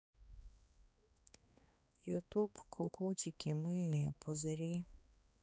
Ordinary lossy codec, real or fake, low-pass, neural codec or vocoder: none; fake; none; codec, 16 kHz, 2 kbps, X-Codec, HuBERT features, trained on balanced general audio